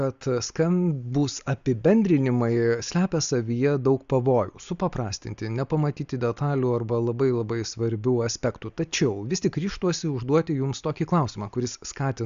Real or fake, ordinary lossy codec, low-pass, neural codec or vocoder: real; Opus, 64 kbps; 7.2 kHz; none